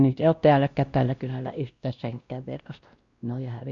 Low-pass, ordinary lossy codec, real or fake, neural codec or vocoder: 7.2 kHz; Opus, 64 kbps; fake; codec, 16 kHz, 1 kbps, X-Codec, WavLM features, trained on Multilingual LibriSpeech